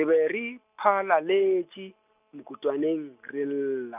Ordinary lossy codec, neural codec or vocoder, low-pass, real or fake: none; none; 3.6 kHz; real